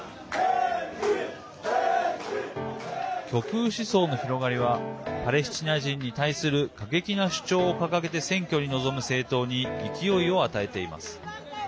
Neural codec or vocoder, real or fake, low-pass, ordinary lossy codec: none; real; none; none